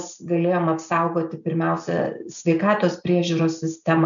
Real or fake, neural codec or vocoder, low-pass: real; none; 7.2 kHz